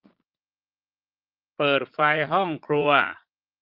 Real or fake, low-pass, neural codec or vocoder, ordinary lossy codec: fake; 5.4 kHz; vocoder, 22.05 kHz, 80 mel bands, Vocos; Opus, 32 kbps